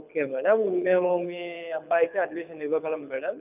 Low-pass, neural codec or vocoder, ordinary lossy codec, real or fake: 3.6 kHz; codec, 24 kHz, 6 kbps, HILCodec; none; fake